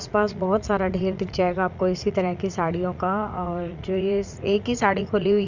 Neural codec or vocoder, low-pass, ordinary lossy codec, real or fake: vocoder, 44.1 kHz, 80 mel bands, Vocos; 7.2 kHz; Opus, 64 kbps; fake